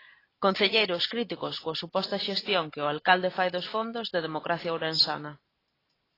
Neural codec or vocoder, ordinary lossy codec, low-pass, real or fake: vocoder, 44.1 kHz, 128 mel bands every 512 samples, BigVGAN v2; AAC, 24 kbps; 5.4 kHz; fake